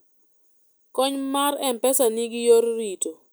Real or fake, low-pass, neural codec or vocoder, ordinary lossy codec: real; none; none; none